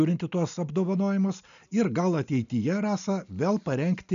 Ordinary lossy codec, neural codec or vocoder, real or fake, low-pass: MP3, 96 kbps; none; real; 7.2 kHz